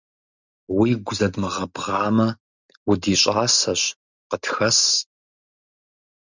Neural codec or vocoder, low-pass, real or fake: none; 7.2 kHz; real